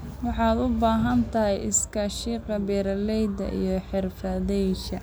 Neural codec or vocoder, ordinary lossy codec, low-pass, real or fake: none; none; none; real